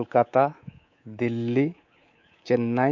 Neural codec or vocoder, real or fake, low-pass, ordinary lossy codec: codec, 24 kHz, 3.1 kbps, DualCodec; fake; 7.2 kHz; MP3, 48 kbps